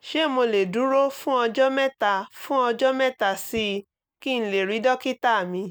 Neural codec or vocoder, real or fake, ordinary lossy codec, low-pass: none; real; none; none